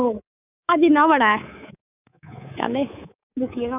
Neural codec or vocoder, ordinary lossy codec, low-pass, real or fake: codec, 24 kHz, 3.1 kbps, DualCodec; none; 3.6 kHz; fake